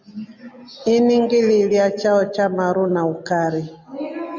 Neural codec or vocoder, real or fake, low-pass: none; real; 7.2 kHz